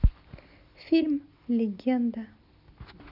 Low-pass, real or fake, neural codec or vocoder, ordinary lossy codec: 5.4 kHz; real; none; none